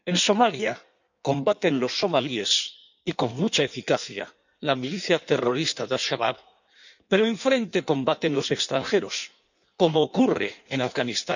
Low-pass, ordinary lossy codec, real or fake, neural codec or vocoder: 7.2 kHz; none; fake; codec, 16 kHz in and 24 kHz out, 1.1 kbps, FireRedTTS-2 codec